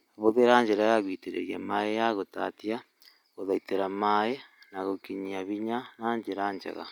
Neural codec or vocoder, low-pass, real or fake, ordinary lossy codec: none; 19.8 kHz; real; none